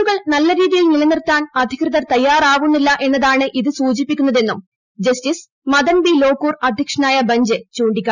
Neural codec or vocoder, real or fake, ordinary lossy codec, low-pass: none; real; none; 7.2 kHz